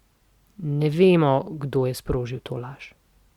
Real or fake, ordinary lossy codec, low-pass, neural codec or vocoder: real; Opus, 64 kbps; 19.8 kHz; none